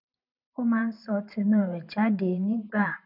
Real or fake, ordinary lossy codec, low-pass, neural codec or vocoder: fake; none; 5.4 kHz; vocoder, 44.1 kHz, 128 mel bands every 512 samples, BigVGAN v2